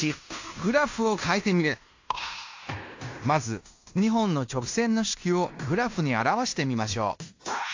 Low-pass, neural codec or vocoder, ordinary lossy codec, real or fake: 7.2 kHz; codec, 16 kHz in and 24 kHz out, 0.9 kbps, LongCat-Audio-Codec, fine tuned four codebook decoder; AAC, 48 kbps; fake